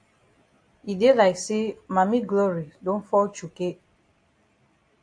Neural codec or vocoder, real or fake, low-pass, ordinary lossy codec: none; real; 9.9 kHz; AAC, 48 kbps